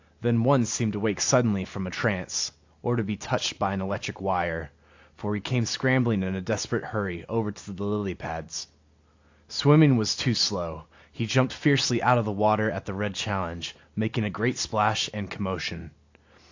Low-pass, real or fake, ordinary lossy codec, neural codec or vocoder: 7.2 kHz; real; AAC, 48 kbps; none